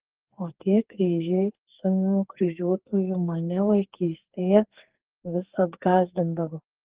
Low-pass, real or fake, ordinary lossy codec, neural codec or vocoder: 3.6 kHz; fake; Opus, 16 kbps; codec, 44.1 kHz, 2.6 kbps, SNAC